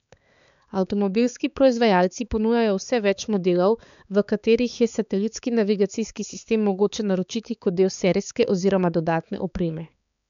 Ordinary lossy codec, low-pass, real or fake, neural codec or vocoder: none; 7.2 kHz; fake; codec, 16 kHz, 4 kbps, X-Codec, HuBERT features, trained on balanced general audio